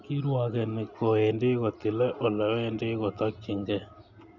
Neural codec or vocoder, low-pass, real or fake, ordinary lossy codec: none; 7.2 kHz; real; none